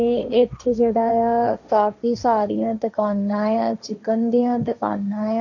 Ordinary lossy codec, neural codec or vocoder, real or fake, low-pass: none; codec, 16 kHz, 1.1 kbps, Voila-Tokenizer; fake; 7.2 kHz